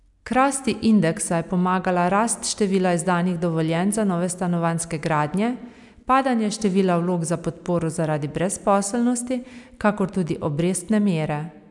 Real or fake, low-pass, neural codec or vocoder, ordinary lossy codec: real; 10.8 kHz; none; MP3, 96 kbps